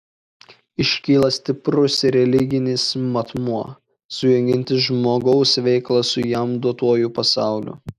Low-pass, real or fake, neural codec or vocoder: 14.4 kHz; real; none